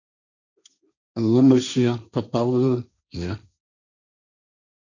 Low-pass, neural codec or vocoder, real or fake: 7.2 kHz; codec, 16 kHz, 1.1 kbps, Voila-Tokenizer; fake